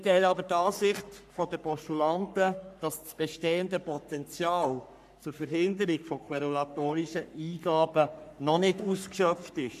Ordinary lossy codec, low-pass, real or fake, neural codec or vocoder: none; 14.4 kHz; fake; codec, 44.1 kHz, 3.4 kbps, Pupu-Codec